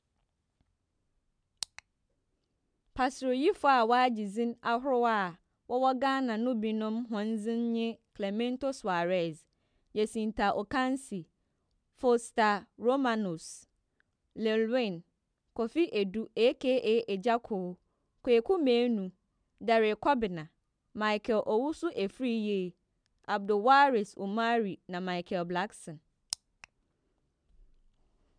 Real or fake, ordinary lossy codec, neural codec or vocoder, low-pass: real; none; none; 9.9 kHz